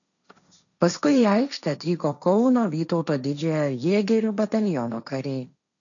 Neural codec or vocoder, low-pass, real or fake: codec, 16 kHz, 1.1 kbps, Voila-Tokenizer; 7.2 kHz; fake